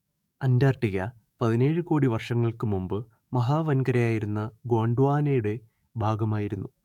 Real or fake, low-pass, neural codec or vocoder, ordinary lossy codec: fake; 19.8 kHz; codec, 44.1 kHz, 7.8 kbps, DAC; none